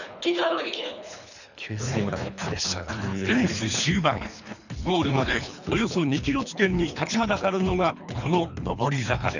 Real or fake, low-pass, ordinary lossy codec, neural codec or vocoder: fake; 7.2 kHz; none; codec, 24 kHz, 3 kbps, HILCodec